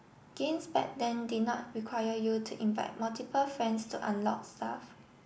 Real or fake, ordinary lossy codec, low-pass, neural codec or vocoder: real; none; none; none